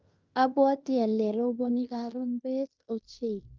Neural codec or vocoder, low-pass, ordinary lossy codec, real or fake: codec, 16 kHz in and 24 kHz out, 0.9 kbps, LongCat-Audio-Codec, fine tuned four codebook decoder; 7.2 kHz; Opus, 24 kbps; fake